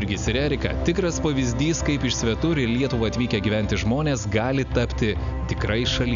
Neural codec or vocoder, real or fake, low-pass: none; real; 7.2 kHz